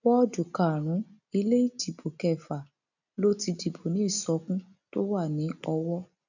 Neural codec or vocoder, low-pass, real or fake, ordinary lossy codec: none; 7.2 kHz; real; none